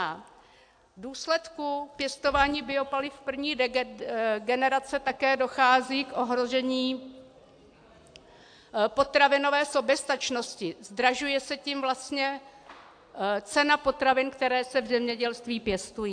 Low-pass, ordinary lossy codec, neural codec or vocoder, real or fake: 9.9 kHz; AAC, 64 kbps; none; real